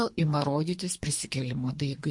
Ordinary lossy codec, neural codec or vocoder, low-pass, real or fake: MP3, 48 kbps; codec, 24 kHz, 3 kbps, HILCodec; 10.8 kHz; fake